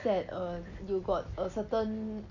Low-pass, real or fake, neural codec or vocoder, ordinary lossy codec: 7.2 kHz; real; none; none